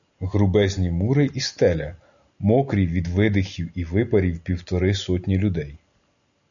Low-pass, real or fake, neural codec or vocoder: 7.2 kHz; real; none